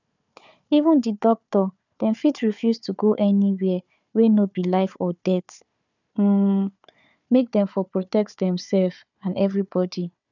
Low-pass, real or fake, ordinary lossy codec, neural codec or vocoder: 7.2 kHz; fake; none; codec, 16 kHz, 4 kbps, FunCodec, trained on Chinese and English, 50 frames a second